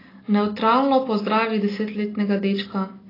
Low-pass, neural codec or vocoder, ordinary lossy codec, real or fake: 5.4 kHz; none; AAC, 24 kbps; real